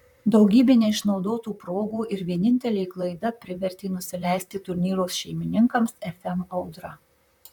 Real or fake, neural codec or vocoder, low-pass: fake; vocoder, 44.1 kHz, 128 mel bands, Pupu-Vocoder; 19.8 kHz